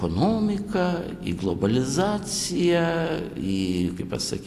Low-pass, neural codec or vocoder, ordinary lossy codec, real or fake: 14.4 kHz; none; AAC, 64 kbps; real